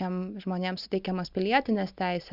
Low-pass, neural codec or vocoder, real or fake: 5.4 kHz; none; real